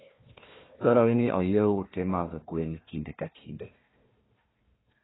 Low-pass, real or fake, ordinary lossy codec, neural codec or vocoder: 7.2 kHz; fake; AAC, 16 kbps; codec, 16 kHz, 1 kbps, FunCodec, trained on Chinese and English, 50 frames a second